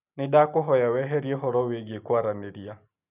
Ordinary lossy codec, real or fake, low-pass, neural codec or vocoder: none; real; 3.6 kHz; none